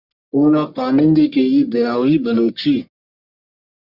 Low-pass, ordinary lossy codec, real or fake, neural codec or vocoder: 5.4 kHz; Opus, 64 kbps; fake; codec, 44.1 kHz, 1.7 kbps, Pupu-Codec